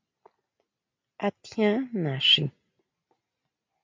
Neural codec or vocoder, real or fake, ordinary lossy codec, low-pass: none; real; MP3, 64 kbps; 7.2 kHz